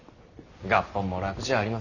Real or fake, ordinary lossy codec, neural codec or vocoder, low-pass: real; none; none; 7.2 kHz